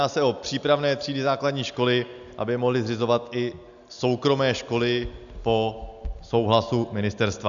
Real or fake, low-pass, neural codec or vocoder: real; 7.2 kHz; none